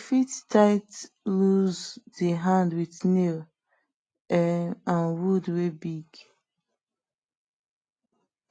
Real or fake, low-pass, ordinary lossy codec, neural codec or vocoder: real; 9.9 kHz; AAC, 32 kbps; none